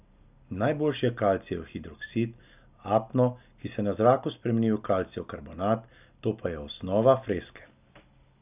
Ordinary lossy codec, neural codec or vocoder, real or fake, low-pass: none; none; real; 3.6 kHz